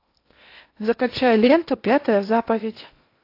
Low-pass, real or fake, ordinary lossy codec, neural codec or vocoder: 5.4 kHz; fake; AAC, 32 kbps; codec, 16 kHz in and 24 kHz out, 0.8 kbps, FocalCodec, streaming, 65536 codes